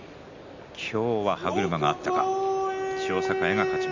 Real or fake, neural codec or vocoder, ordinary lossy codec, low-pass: real; none; MP3, 64 kbps; 7.2 kHz